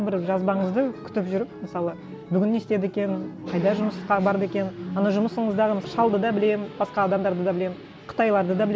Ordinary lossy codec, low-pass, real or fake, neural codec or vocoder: none; none; real; none